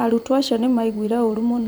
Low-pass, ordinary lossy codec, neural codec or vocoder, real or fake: none; none; vocoder, 44.1 kHz, 128 mel bands every 256 samples, BigVGAN v2; fake